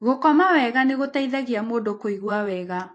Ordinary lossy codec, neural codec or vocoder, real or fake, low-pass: AAC, 48 kbps; vocoder, 24 kHz, 100 mel bands, Vocos; fake; 10.8 kHz